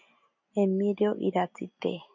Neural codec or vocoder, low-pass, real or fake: none; 7.2 kHz; real